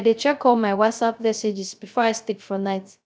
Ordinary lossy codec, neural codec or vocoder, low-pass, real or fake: none; codec, 16 kHz, 0.3 kbps, FocalCodec; none; fake